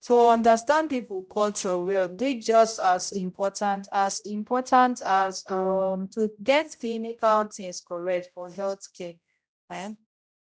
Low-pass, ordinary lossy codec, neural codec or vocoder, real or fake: none; none; codec, 16 kHz, 0.5 kbps, X-Codec, HuBERT features, trained on general audio; fake